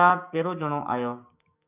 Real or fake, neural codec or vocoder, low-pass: fake; codec, 16 kHz, 6 kbps, DAC; 3.6 kHz